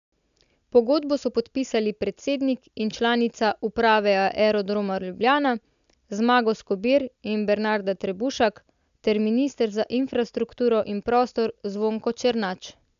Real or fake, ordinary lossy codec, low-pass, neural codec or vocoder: real; AAC, 96 kbps; 7.2 kHz; none